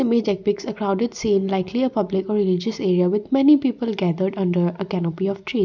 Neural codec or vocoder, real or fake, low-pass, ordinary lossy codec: none; real; 7.2 kHz; none